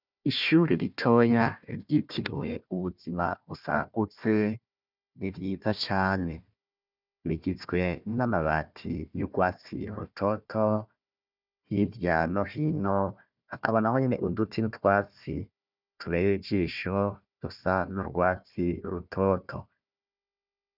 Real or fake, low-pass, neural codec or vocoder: fake; 5.4 kHz; codec, 16 kHz, 1 kbps, FunCodec, trained on Chinese and English, 50 frames a second